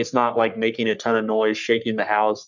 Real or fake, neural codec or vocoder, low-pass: fake; codec, 44.1 kHz, 3.4 kbps, Pupu-Codec; 7.2 kHz